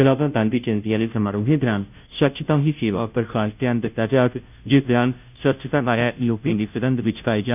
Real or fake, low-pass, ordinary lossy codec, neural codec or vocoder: fake; 3.6 kHz; none; codec, 16 kHz, 0.5 kbps, FunCodec, trained on Chinese and English, 25 frames a second